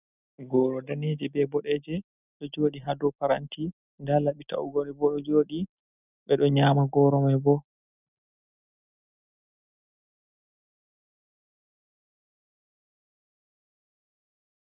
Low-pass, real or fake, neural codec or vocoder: 3.6 kHz; real; none